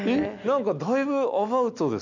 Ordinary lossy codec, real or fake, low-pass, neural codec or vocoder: none; real; 7.2 kHz; none